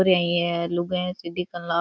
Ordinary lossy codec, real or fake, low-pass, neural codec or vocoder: none; real; none; none